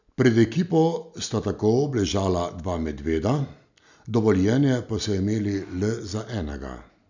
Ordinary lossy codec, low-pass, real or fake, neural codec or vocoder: none; 7.2 kHz; real; none